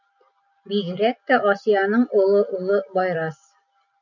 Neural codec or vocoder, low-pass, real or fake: none; 7.2 kHz; real